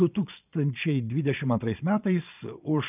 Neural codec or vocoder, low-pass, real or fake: vocoder, 22.05 kHz, 80 mel bands, Vocos; 3.6 kHz; fake